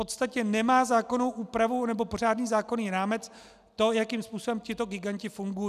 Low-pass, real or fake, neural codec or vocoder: 14.4 kHz; real; none